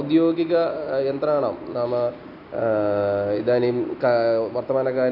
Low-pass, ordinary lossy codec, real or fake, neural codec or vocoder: 5.4 kHz; none; real; none